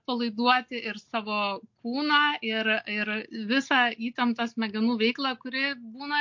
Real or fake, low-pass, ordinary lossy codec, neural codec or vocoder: real; 7.2 kHz; MP3, 48 kbps; none